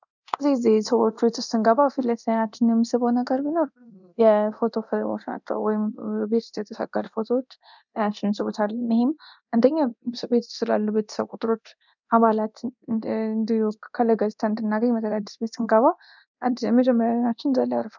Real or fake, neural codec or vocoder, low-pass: fake; codec, 24 kHz, 0.9 kbps, DualCodec; 7.2 kHz